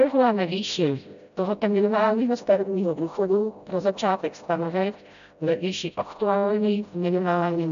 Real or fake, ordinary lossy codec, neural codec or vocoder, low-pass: fake; AAC, 96 kbps; codec, 16 kHz, 0.5 kbps, FreqCodec, smaller model; 7.2 kHz